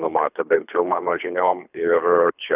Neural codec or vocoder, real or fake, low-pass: codec, 24 kHz, 3 kbps, HILCodec; fake; 3.6 kHz